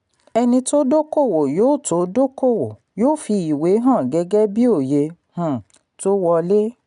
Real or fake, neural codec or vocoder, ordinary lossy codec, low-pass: real; none; none; 10.8 kHz